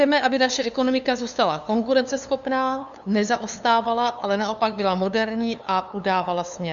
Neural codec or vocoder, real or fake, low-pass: codec, 16 kHz, 2 kbps, FunCodec, trained on LibriTTS, 25 frames a second; fake; 7.2 kHz